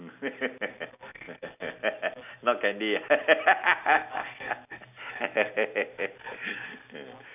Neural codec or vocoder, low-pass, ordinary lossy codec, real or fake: none; 3.6 kHz; none; real